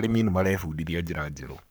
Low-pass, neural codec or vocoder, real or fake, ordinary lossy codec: none; codec, 44.1 kHz, 7.8 kbps, Pupu-Codec; fake; none